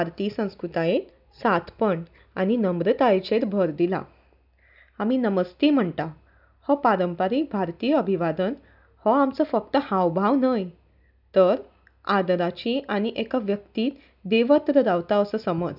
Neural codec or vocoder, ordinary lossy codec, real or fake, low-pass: none; none; real; 5.4 kHz